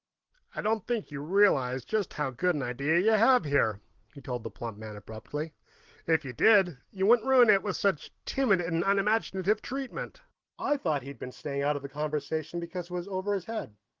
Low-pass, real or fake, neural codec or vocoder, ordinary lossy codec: 7.2 kHz; real; none; Opus, 16 kbps